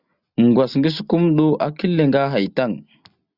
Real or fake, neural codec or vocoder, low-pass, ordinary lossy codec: real; none; 5.4 kHz; Opus, 64 kbps